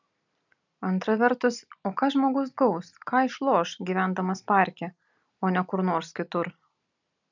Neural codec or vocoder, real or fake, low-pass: none; real; 7.2 kHz